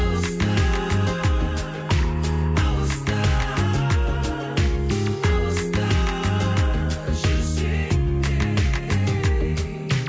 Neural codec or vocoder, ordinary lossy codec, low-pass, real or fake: none; none; none; real